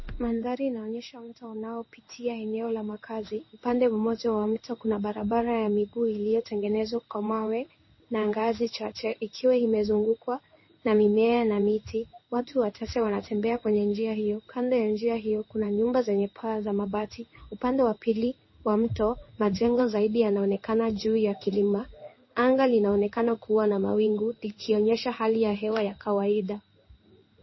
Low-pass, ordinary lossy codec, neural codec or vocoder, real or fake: 7.2 kHz; MP3, 24 kbps; codec, 16 kHz in and 24 kHz out, 1 kbps, XY-Tokenizer; fake